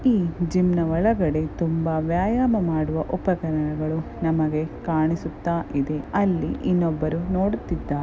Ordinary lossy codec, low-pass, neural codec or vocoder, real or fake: none; none; none; real